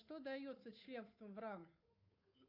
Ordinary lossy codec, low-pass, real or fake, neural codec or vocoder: AAC, 48 kbps; 5.4 kHz; fake; codec, 16 kHz, 8 kbps, FunCodec, trained on Chinese and English, 25 frames a second